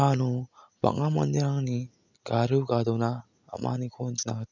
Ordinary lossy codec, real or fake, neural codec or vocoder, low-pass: none; real; none; 7.2 kHz